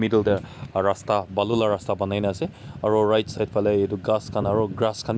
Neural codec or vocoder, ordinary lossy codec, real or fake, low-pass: none; none; real; none